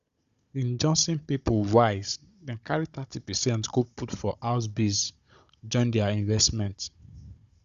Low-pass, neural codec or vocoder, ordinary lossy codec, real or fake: 7.2 kHz; codec, 16 kHz, 16 kbps, FunCodec, trained on Chinese and English, 50 frames a second; Opus, 64 kbps; fake